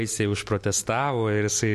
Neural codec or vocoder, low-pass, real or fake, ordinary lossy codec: none; 14.4 kHz; real; MP3, 64 kbps